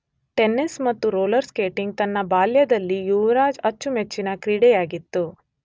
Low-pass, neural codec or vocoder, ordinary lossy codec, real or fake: none; none; none; real